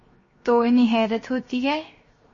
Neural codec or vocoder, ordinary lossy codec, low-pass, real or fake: codec, 16 kHz, 0.7 kbps, FocalCodec; MP3, 32 kbps; 7.2 kHz; fake